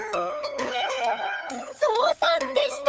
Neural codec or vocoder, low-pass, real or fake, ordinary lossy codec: codec, 16 kHz, 8 kbps, FunCodec, trained on LibriTTS, 25 frames a second; none; fake; none